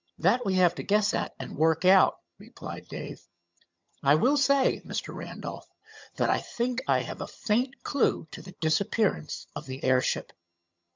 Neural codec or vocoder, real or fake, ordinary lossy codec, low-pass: vocoder, 22.05 kHz, 80 mel bands, HiFi-GAN; fake; MP3, 64 kbps; 7.2 kHz